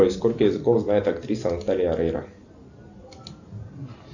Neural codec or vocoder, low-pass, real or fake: none; 7.2 kHz; real